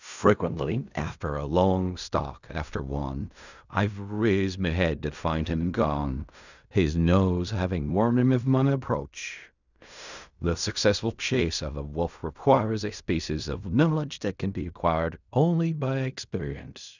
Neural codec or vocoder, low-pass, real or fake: codec, 16 kHz in and 24 kHz out, 0.4 kbps, LongCat-Audio-Codec, fine tuned four codebook decoder; 7.2 kHz; fake